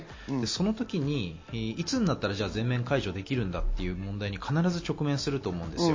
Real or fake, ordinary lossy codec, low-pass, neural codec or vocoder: real; MP3, 32 kbps; 7.2 kHz; none